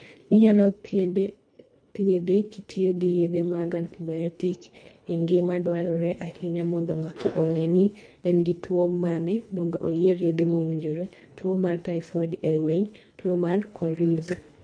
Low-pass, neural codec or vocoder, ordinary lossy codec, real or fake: 9.9 kHz; codec, 24 kHz, 1.5 kbps, HILCodec; MP3, 64 kbps; fake